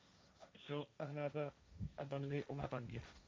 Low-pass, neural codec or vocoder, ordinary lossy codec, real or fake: none; codec, 16 kHz, 1.1 kbps, Voila-Tokenizer; none; fake